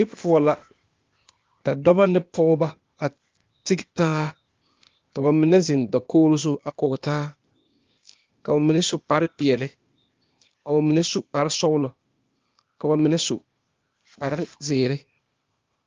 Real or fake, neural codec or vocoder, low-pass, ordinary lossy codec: fake; codec, 16 kHz, 0.8 kbps, ZipCodec; 7.2 kHz; Opus, 32 kbps